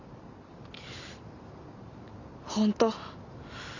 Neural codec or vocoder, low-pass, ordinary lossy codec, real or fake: none; 7.2 kHz; none; real